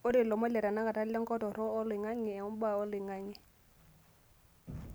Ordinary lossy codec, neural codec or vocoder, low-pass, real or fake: none; none; none; real